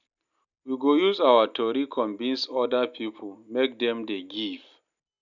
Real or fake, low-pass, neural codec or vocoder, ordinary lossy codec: real; 7.2 kHz; none; none